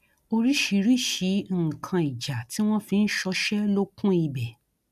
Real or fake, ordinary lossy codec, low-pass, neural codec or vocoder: real; none; 14.4 kHz; none